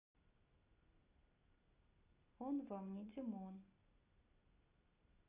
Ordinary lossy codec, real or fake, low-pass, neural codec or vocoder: none; real; 3.6 kHz; none